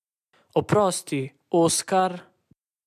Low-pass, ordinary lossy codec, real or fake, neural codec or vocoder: 14.4 kHz; MP3, 96 kbps; real; none